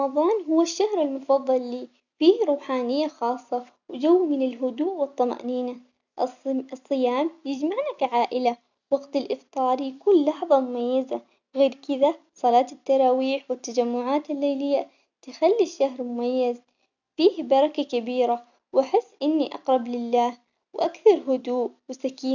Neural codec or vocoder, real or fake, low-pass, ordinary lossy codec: none; real; 7.2 kHz; none